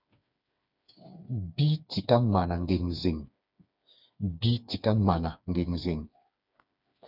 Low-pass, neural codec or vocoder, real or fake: 5.4 kHz; codec, 16 kHz, 4 kbps, FreqCodec, smaller model; fake